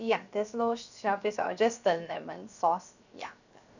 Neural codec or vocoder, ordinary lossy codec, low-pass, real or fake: codec, 16 kHz, 0.7 kbps, FocalCodec; none; 7.2 kHz; fake